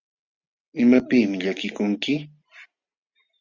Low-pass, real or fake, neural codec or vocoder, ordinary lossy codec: 7.2 kHz; real; none; Opus, 64 kbps